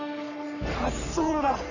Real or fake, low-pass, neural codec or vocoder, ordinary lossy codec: fake; 7.2 kHz; codec, 44.1 kHz, 3.4 kbps, Pupu-Codec; none